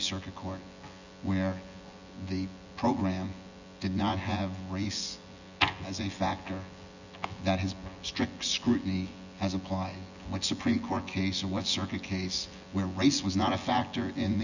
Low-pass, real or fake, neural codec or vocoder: 7.2 kHz; fake; vocoder, 24 kHz, 100 mel bands, Vocos